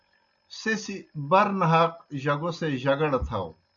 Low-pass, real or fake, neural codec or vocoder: 7.2 kHz; real; none